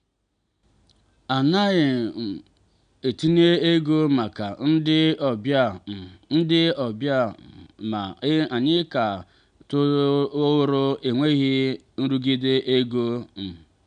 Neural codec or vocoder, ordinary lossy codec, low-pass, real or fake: none; none; 9.9 kHz; real